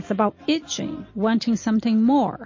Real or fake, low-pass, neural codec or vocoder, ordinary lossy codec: real; 7.2 kHz; none; MP3, 32 kbps